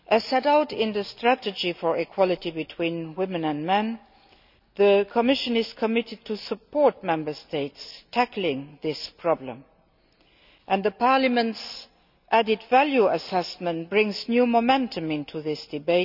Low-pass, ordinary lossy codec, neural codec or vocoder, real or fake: 5.4 kHz; none; none; real